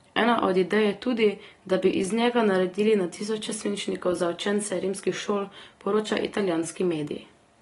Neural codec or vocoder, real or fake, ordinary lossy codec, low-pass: none; real; AAC, 32 kbps; 10.8 kHz